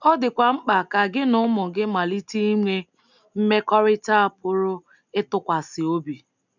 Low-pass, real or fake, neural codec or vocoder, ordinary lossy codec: 7.2 kHz; real; none; none